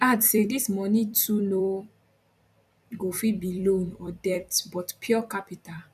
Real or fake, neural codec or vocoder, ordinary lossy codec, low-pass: fake; vocoder, 44.1 kHz, 128 mel bands every 256 samples, BigVGAN v2; none; 14.4 kHz